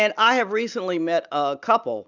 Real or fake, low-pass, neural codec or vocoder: real; 7.2 kHz; none